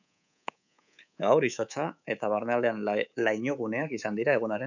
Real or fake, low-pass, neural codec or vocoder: fake; 7.2 kHz; codec, 24 kHz, 3.1 kbps, DualCodec